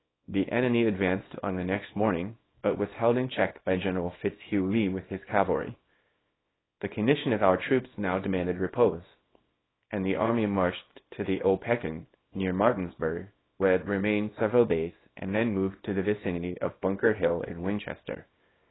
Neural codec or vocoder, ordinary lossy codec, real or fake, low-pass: codec, 24 kHz, 0.9 kbps, WavTokenizer, small release; AAC, 16 kbps; fake; 7.2 kHz